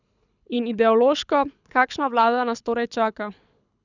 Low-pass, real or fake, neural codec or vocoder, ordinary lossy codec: 7.2 kHz; fake; codec, 24 kHz, 6 kbps, HILCodec; none